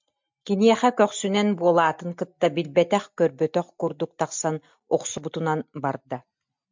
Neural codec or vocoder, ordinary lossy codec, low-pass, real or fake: none; MP3, 64 kbps; 7.2 kHz; real